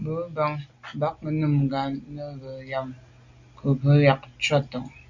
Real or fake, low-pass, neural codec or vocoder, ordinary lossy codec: real; 7.2 kHz; none; Opus, 64 kbps